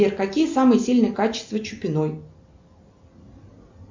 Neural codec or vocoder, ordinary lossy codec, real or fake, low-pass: none; MP3, 64 kbps; real; 7.2 kHz